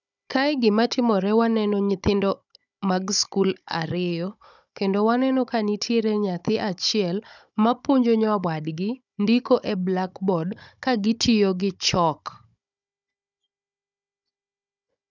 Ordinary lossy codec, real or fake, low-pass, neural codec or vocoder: none; fake; 7.2 kHz; codec, 16 kHz, 16 kbps, FunCodec, trained on Chinese and English, 50 frames a second